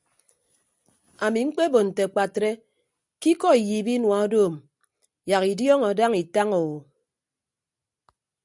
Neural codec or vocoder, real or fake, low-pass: none; real; 10.8 kHz